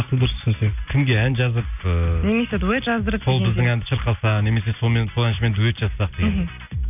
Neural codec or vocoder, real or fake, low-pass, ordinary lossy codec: none; real; 3.6 kHz; none